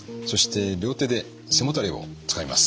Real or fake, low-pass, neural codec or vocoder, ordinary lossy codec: real; none; none; none